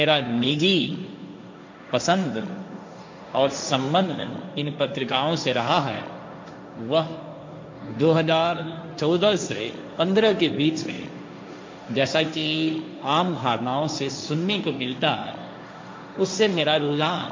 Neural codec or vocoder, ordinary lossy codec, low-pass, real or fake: codec, 16 kHz, 1.1 kbps, Voila-Tokenizer; MP3, 48 kbps; 7.2 kHz; fake